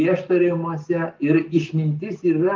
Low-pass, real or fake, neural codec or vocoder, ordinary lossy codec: 7.2 kHz; real; none; Opus, 32 kbps